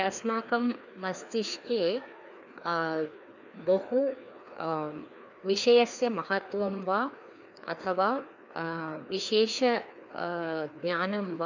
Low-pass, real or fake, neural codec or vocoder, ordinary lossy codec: 7.2 kHz; fake; codec, 16 kHz, 2 kbps, FreqCodec, larger model; none